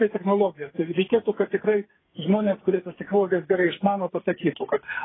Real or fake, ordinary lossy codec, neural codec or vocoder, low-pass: fake; AAC, 16 kbps; codec, 44.1 kHz, 2.6 kbps, SNAC; 7.2 kHz